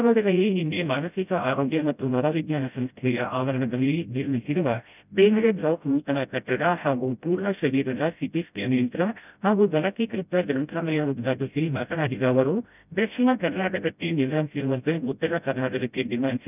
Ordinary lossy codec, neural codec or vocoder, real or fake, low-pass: none; codec, 16 kHz, 0.5 kbps, FreqCodec, smaller model; fake; 3.6 kHz